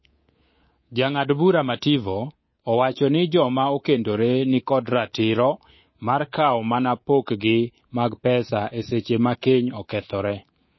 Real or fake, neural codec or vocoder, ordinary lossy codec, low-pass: fake; codec, 24 kHz, 3.1 kbps, DualCodec; MP3, 24 kbps; 7.2 kHz